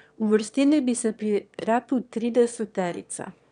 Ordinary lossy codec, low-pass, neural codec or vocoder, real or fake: none; 9.9 kHz; autoencoder, 22.05 kHz, a latent of 192 numbers a frame, VITS, trained on one speaker; fake